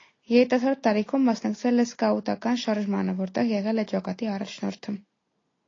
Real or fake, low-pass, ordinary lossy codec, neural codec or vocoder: real; 7.2 kHz; AAC, 32 kbps; none